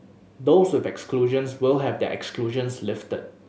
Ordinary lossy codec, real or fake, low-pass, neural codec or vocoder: none; real; none; none